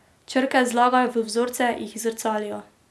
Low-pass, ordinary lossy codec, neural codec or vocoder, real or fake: none; none; none; real